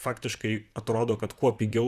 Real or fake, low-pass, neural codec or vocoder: fake; 14.4 kHz; vocoder, 44.1 kHz, 128 mel bands, Pupu-Vocoder